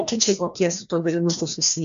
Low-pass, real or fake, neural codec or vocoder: 7.2 kHz; fake; codec, 16 kHz, 1 kbps, FreqCodec, larger model